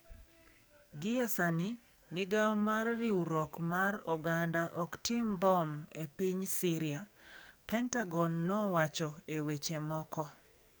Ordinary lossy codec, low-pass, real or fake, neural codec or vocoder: none; none; fake; codec, 44.1 kHz, 2.6 kbps, SNAC